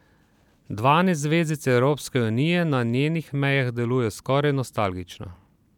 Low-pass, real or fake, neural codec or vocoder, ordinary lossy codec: 19.8 kHz; fake; vocoder, 44.1 kHz, 128 mel bands every 256 samples, BigVGAN v2; none